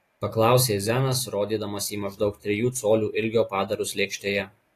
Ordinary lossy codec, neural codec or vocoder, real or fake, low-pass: AAC, 48 kbps; none; real; 14.4 kHz